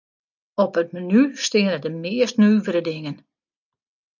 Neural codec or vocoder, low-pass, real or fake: none; 7.2 kHz; real